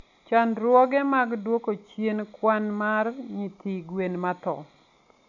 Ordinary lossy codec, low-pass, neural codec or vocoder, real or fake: none; 7.2 kHz; none; real